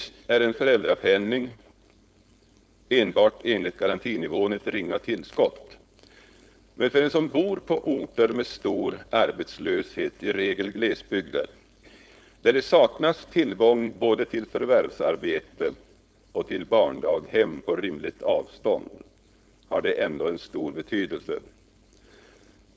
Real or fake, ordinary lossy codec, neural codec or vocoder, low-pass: fake; none; codec, 16 kHz, 4.8 kbps, FACodec; none